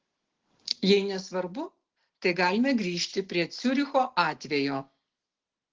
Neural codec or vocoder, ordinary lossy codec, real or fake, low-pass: none; Opus, 16 kbps; real; 7.2 kHz